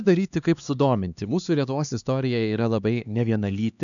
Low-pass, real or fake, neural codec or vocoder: 7.2 kHz; fake; codec, 16 kHz, 2 kbps, X-Codec, HuBERT features, trained on LibriSpeech